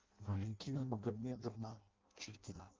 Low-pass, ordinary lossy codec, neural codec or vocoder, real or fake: 7.2 kHz; Opus, 24 kbps; codec, 16 kHz in and 24 kHz out, 0.6 kbps, FireRedTTS-2 codec; fake